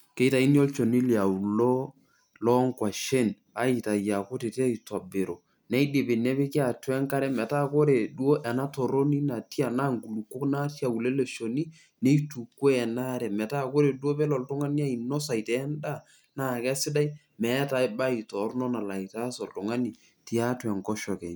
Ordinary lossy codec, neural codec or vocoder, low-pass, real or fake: none; none; none; real